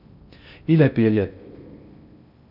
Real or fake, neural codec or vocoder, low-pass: fake; codec, 16 kHz in and 24 kHz out, 0.6 kbps, FocalCodec, streaming, 2048 codes; 5.4 kHz